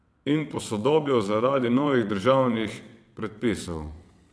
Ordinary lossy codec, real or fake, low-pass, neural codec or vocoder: none; fake; none; vocoder, 22.05 kHz, 80 mel bands, WaveNeXt